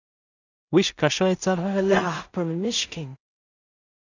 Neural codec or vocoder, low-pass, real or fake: codec, 16 kHz in and 24 kHz out, 0.4 kbps, LongCat-Audio-Codec, two codebook decoder; 7.2 kHz; fake